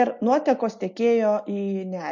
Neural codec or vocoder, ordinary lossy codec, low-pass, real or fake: none; MP3, 48 kbps; 7.2 kHz; real